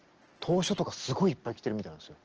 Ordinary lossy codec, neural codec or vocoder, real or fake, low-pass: Opus, 16 kbps; none; real; 7.2 kHz